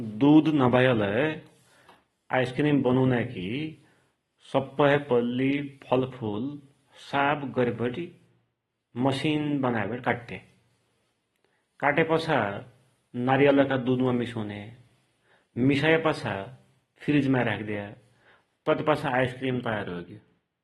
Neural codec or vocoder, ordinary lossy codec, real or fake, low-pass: none; AAC, 32 kbps; real; 19.8 kHz